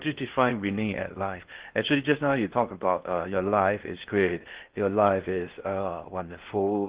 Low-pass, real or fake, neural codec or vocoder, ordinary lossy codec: 3.6 kHz; fake; codec, 16 kHz in and 24 kHz out, 0.6 kbps, FocalCodec, streaming, 2048 codes; Opus, 16 kbps